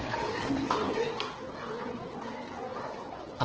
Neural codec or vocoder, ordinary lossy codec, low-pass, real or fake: codec, 16 kHz, 4 kbps, FreqCodec, larger model; Opus, 16 kbps; 7.2 kHz; fake